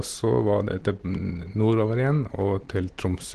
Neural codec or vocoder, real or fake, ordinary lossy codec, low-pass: none; real; Opus, 16 kbps; 10.8 kHz